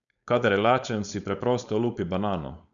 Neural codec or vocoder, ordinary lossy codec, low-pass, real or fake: codec, 16 kHz, 4.8 kbps, FACodec; none; 7.2 kHz; fake